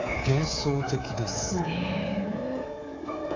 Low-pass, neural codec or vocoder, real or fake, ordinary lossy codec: 7.2 kHz; codec, 24 kHz, 3.1 kbps, DualCodec; fake; AAC, 32 kbps